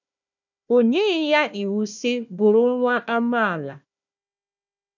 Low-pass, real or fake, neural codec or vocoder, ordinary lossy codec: 7.2 kHz; fake; codec, 16 kHz, 1 kbps, FunCodec, trained on Chinese and English, 50 frames a second; none